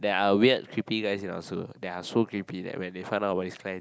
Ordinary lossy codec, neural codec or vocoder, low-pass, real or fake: none; none; none; real